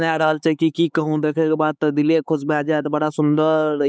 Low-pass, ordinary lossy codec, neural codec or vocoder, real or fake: none; none; codec, 16 kHz, 4 kbps, X-Codec, HuBERT features, trained on LibriSpeech; fake